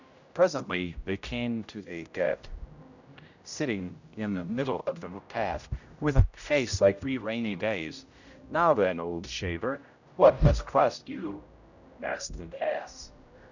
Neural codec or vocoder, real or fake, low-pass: codec, 16 kHz, 0.5 kbps, X-Codec, HuBERT features, trained on general audio; fake; 7.2 kHz